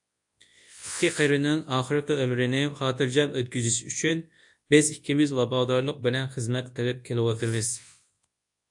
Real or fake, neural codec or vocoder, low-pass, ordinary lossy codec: fake; codec, 24 kHz, 0.9 kbps, WavTokenizer, large speech release; 10.8 kHz; AAC, 64 kbps